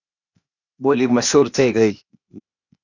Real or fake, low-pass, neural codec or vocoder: fake; 7.2 kHz; codec, 16 kHz, 0.8 kbps, ZipCodec